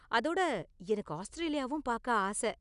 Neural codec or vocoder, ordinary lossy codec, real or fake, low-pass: none; none; real; 10.8 kHz